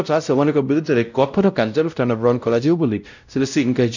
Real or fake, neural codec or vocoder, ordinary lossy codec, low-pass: fake; codec, 16 kHz, 0.5 kbps, X-Codec, WavLM features, trained on Multilingual LibriSpeech; none; 7.2 kHz